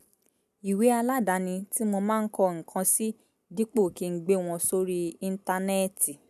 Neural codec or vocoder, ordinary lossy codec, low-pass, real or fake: none; none; 14.4 kHz; real